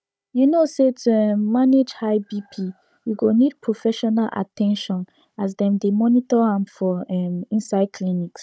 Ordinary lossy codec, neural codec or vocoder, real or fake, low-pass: none; codec, 16 kHz, 16 kbps, FunCodec, trained on Chinese and English, 50 frames a second; fake; none